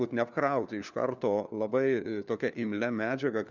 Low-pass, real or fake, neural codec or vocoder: 7.2 kHz; fake; codec, 16 kHz, 4 kbps, FunCodec, trained on LibriTTS, 50 frames a second